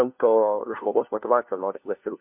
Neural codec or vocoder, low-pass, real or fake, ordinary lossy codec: codec, 16 kHz, 1 kbps, FunCodec, trained on LibriTTS, 50 frames a second; 3.6 kHz; fake; MP3, 32 kbps